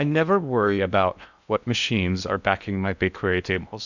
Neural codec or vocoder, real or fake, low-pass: codec, 16 kHz in and 24 kHz out, 0.6 kbps, FocalCodec, streaming, 2048 codes; fake; 7.2 kHz